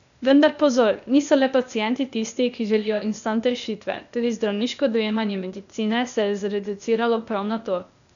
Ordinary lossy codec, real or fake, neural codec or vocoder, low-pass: none; fake; codec, 16 kHz, 0.8 kbps, ZipCodec; 7.2 kHz